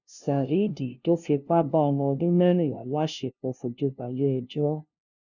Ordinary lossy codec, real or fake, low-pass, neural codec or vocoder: none; fake; 7.2 kHz; codec, 16 kHz, 0.5 kbps, FunCodec, trained on LibriTTS, 25 frames a second